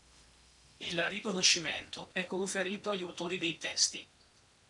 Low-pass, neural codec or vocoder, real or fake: 10.8 kHz; codec, 16 kHz in and 24 kHz out, 0.8 kbps, FocalCodec, streaming, 65536 codes; fake